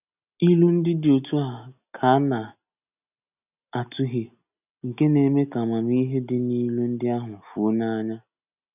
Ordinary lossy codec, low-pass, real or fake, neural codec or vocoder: none; 3.6 kHz; real; none